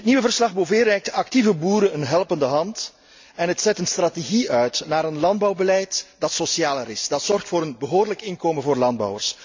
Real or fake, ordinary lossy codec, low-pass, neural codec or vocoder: real; MP3, 64 kbps; 7.2 kHz; none